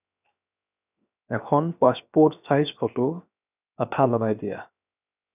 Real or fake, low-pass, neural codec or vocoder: fake; 3.6 kHz; codec, 16 kHz, 0.7 kbps, FocalCodec